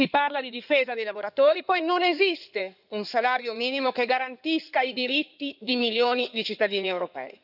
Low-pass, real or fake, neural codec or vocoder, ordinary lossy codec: 5.4 kHz; fake; codec, 16 kHz in and 24 kHz out, 2.2 kbps, FireRedTTS-2 codec; none